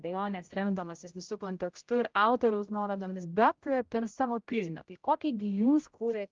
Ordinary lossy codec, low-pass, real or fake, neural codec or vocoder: Opus, 32 kbps; 7.2 kHz; fake; codec, 16 kHz, 0.5 kbps, X-Codec, HuBERT features, trained on general audio